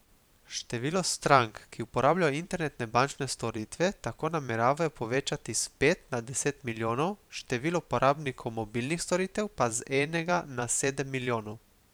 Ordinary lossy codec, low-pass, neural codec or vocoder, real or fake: none; none; vocoder, 44.1 kHz, 128 mel bands every 512 samples, BigVGAN v2; fake